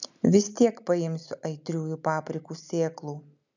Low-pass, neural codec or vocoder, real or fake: 7.2 kHz; none; real